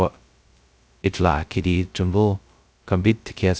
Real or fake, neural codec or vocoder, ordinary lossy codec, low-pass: fake; codec, 16 kHz, 0.2 kbps, FocalCodec; none; none